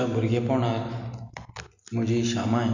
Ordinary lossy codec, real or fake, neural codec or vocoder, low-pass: none; real; none; 7.2 kHz